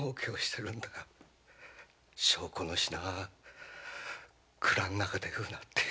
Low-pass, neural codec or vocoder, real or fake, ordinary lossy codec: none; none; real; none